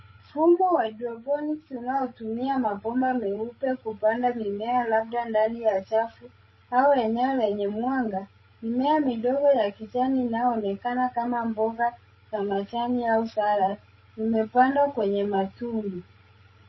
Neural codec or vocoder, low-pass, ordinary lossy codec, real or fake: codec, 16 kHz, 16 kbps, FreqCodec, larger model; 7.2 kHz; MP3, 24 kbps; fake